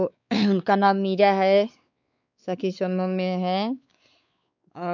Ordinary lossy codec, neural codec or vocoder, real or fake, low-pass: none; codec, 16 kHz, 4 kbps, X-Codec, WavLM features, trained on Multilingual LibriSpeech; fake; 7.2 kHz